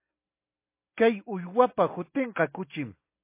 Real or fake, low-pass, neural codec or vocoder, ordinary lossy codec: real; 3.6 kHz; none; MP3, 24 kbps